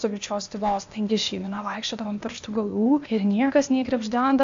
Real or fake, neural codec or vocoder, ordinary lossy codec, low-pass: fake; codec, 16 kHz, 0.8 kbps, ZipCodec; AAC, 64 kbps; 7.2 kHz